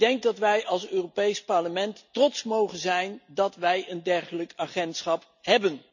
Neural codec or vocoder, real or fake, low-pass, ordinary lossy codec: none; real; 7.2 kHz; none